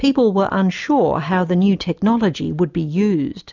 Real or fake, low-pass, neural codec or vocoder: real; 7.2 kHz; none